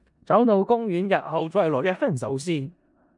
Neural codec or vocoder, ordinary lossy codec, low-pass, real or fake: codec, 16 kHz in and 24 kHz out, 0.4 kbps, LongCat-Audio-Codec, four codebook decoder; MP3, 64 kbps; 10.8 kHz; fake